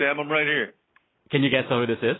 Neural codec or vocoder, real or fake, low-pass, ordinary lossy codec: none; real; 7.2 kHz; AAC, 16 kbps